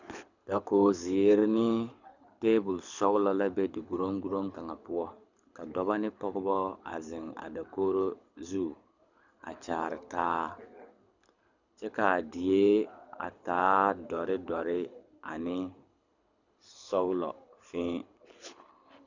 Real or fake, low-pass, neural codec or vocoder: fake; 7.2 kHz; codec, 24 kHz, 6 kbps, HILCodec